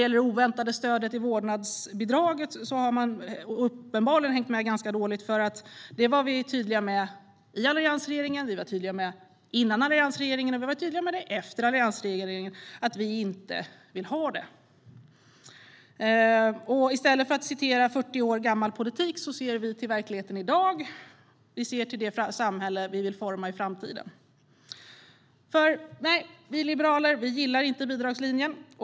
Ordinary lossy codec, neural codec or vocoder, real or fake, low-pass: none; none; real; none